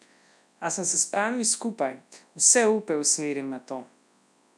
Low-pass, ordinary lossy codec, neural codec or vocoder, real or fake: none; none; codec, 24 kHz, 0.9 kbps, WavTokenizer, large speech release; fake